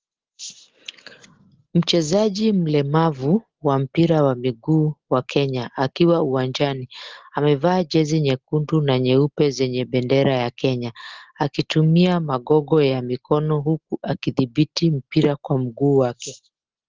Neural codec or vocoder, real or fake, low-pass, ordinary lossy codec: none; real; 7.2 kHz; Opus, 16 kbps